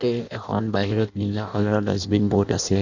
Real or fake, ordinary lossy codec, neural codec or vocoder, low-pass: fake; none; codec, 16 kHz in and 24 kHz out, 0.6 kbps, FireRedTTS-2 codec; 7.2 kHz